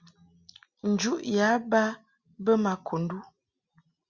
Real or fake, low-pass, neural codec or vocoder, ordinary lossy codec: real; 7.2 kHz; none; Opus, 64 kbps